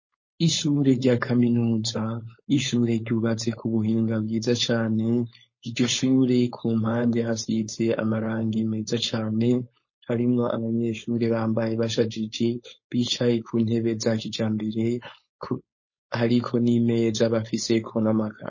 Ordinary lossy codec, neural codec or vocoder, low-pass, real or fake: MP3, 32 kbps; codec, 16 kHz, 4.8 kbps, FACodec; 7.2 kHz; fake